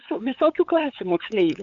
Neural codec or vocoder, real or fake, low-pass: codec, 16 kHz, 8 kbps, FunCodec, trained on LibriTTS, 25 frames a second; fake; 7.2 kHz